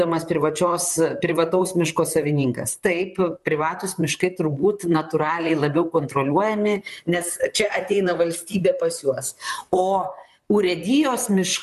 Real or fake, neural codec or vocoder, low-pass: fake; vocoder, 44.1 kHz, 128 mel bands, Pupu-Vocoder; 14.4 kHz